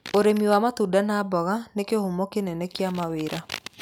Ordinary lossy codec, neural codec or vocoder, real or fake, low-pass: none; none; real; 19.8 kHz